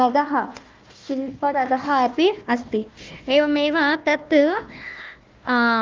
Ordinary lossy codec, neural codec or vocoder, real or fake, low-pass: Opus, 24 kbps; codec, 16 kHz, 1 kbps, FunCodec, trained on Chinese and English, 50 frames a second; fake; 7.2 kHz